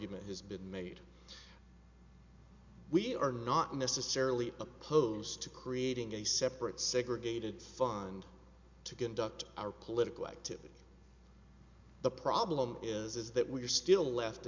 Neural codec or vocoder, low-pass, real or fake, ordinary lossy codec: none; 7.2 kHz; real; MP3, 64 kbps